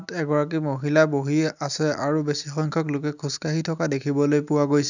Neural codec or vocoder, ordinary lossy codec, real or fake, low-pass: none; none; real; 7.2 kHz